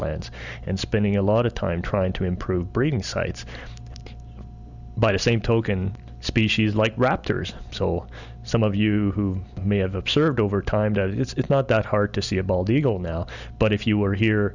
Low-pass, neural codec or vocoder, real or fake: 7.2 kHz; none; real